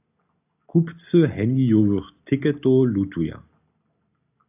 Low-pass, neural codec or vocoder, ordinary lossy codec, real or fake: 3.6 kHz; none; AAC, 32 kbps; real